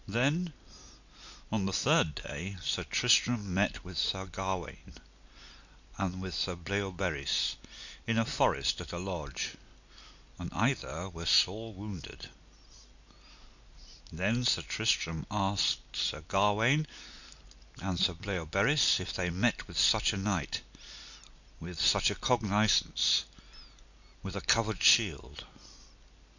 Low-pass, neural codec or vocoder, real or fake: 7.2 kHz; none; real